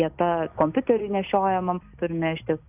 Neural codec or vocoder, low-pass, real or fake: none; 3.6 kHz; real